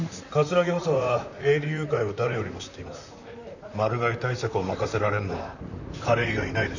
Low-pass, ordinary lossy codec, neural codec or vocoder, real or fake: 7.2 kHz; none; vocoder, 44.1 kHz, 128 mel bands, Pupu-Vocoder; fake